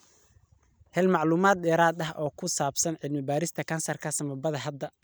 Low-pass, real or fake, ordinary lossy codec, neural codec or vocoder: none; real; none; none